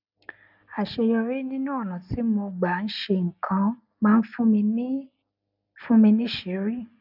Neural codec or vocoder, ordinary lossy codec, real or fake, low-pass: none; none; real; 5.4 kHz